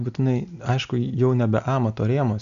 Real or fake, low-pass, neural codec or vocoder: real; 7.2 kHz; none